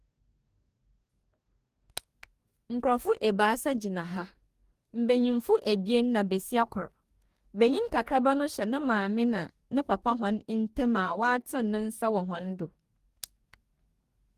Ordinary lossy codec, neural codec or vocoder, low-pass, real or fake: Opus, 24 kbps; codec, 44.1 kHz, 2.6 kbps, DAC; 14.4 kHz; fake